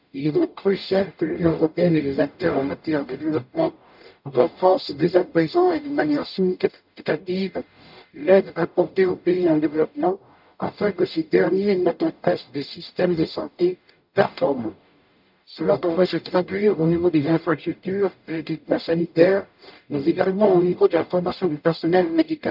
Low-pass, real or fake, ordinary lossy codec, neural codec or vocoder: 5.4 kHz; fake; none; codec, 44.1 kHz, 0.9 kbps, DAC